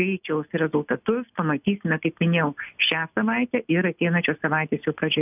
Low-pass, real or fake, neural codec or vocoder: 3.6 kHz; real; none